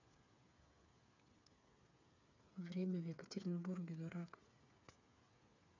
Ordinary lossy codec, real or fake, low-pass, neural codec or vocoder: none; fake; 7.2 kHz; codec, 16 kHz, 8 kbps, FreqCodec, smaller model